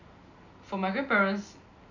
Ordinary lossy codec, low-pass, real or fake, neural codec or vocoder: none; 7.2 kHz; real; none